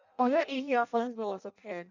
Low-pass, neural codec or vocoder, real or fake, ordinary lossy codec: 7.2 kHz; codec, 16 kHz in and 24 kHz out, 0.6 kbps, FireRedTTS-2 codec; fake; none